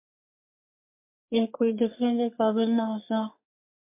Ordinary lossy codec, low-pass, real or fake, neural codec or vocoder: MP3, 32 kbps; 3.6 kHz; fake; codec, 44.1 kHz, 3.4 kbps, Pupu-Codec